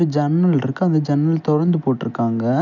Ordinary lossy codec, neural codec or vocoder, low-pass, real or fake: none; none; 7.2 kHz; real